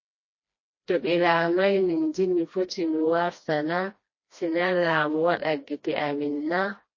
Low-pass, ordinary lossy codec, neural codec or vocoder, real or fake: 7.2 kHz; MP3, 32 kbps; codec, 16 kHz, 1 kbps, FreqCodec, smaller model; fake